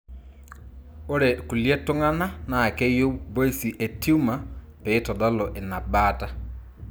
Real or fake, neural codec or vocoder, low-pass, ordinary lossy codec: real; none; none; none